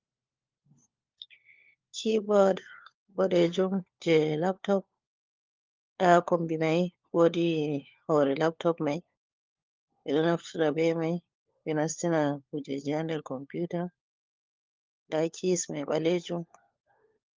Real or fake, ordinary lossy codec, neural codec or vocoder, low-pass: fake; Opus, 24 kbps; codec, 16 kHz, 4 kbps, FunCodec, trained on LibriTTS, 50 frames a second; 7.2 kHz